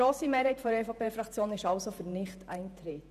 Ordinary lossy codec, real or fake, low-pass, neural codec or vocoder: none; fake; 14.4 kHz; vocoder, 48 kHz, 128 mel bands, Vocos